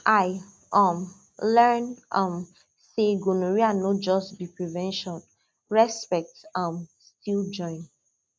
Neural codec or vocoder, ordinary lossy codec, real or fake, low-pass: none; none; real; none